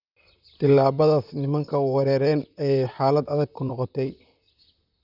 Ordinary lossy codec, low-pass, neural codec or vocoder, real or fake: none; 5.4 kHz; vocoder, 44.1 kHz, 128 mel bands, Pupu-Vocoder; fake